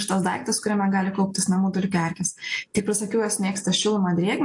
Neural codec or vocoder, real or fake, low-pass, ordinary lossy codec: none; real; 10.8 kHz; AAC, 64 kbps